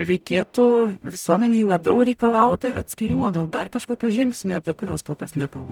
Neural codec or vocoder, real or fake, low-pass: codec, 44.1 kHz, 0.9 kbps, DAC; fake; 19.8 kHz